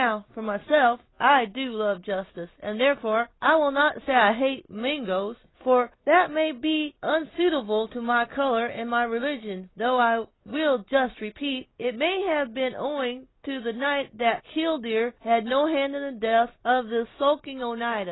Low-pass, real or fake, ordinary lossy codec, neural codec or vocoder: 7.2 kHz; real; AAC, 16 kbps; none